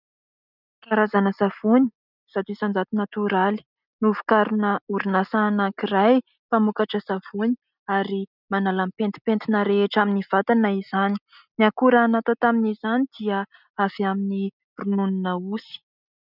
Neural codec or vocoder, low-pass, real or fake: none; 5.4 kHz; real